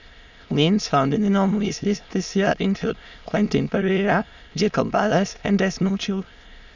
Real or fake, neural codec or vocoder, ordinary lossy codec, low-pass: fake; autoencoder, 22.05 kHz, a latent of 192 numbers a frame, VITS, trained on many speakers; none; 7.2 kHz